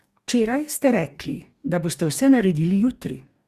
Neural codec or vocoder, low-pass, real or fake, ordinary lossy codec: codec, 44.1 kHz, 2.6 kbps, DAC; 14.4 kHz; fake; Opus, 64 kbps